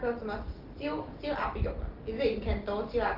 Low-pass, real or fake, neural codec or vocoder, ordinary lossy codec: 5.4 kHz; real; none; Opus, 16 kbps